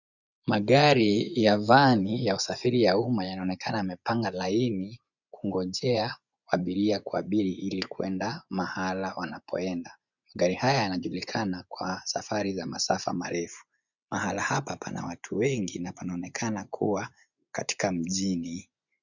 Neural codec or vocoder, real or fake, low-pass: vocoder, 24 kHz, 100 mel bands, Vocos; fake; 7.2 kHz